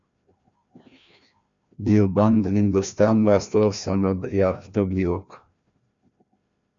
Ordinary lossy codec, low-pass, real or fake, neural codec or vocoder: MP3, 64 kbps; 7.2 kHz; fake; codec, 16 kHz, 1 kbps, FreqCodec, larger model